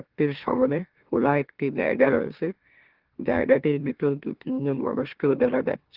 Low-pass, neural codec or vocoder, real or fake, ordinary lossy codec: 5.4 kHz; autoencoder, 44.1 kHz, a latent of 192 numbers a frame, MeloTTS; fake; Opus, 16 kbps